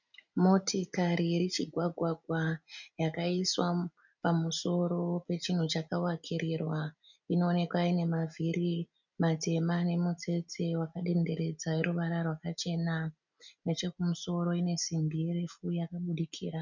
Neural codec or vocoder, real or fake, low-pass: none; real; 7.2 kHz